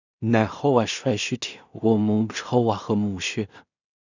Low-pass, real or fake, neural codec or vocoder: 7.2 kHz; fake; codec, 16 kHz in and 24 kHz out, 0.4 kbps, LongCat-Audio-Codec, two codebook decoder